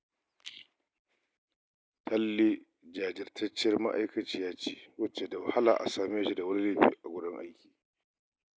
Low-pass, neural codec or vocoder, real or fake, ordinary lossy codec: none; none; real; none